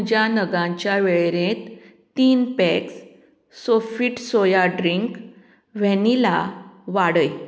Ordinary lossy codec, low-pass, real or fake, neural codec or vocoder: none; none; real; none